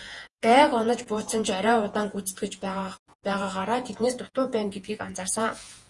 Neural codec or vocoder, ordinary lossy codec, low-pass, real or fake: vocoder, 48 kHz, 128 mel bands, Vocos; Opus, 32 kbps; 10.8 kHz; fake